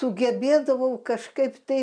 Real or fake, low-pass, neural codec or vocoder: real; 9.9 kHz; none